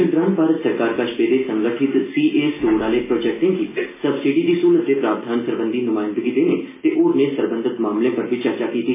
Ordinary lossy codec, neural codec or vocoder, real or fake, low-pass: MP3, 16 kbps; none; real; 3.6 kHz